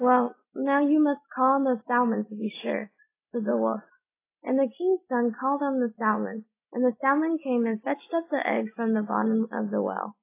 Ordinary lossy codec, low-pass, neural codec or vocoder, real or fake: AAC, 24 kbps; 3.6 kHz; none; real